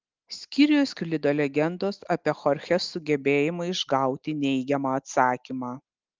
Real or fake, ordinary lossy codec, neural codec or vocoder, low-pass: real; Opus, 32 kbps; none; 7.2 kHz